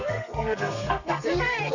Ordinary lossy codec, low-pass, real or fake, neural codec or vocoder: none; 7.2 kHz; fake; codec, 32 kHz, 1.9 kbps, SNAC